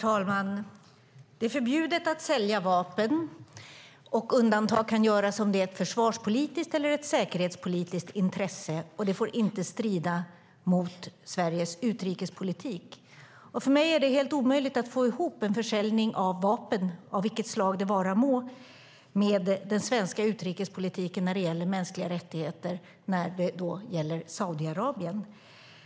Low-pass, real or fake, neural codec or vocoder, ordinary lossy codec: none; real; none; none